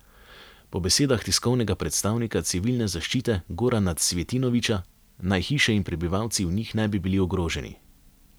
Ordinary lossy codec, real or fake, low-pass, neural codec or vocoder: none; real; none; none